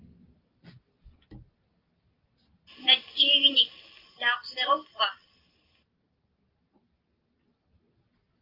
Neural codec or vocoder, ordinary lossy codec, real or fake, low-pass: none; Opus, 32 kbps; real; 5.4 kHz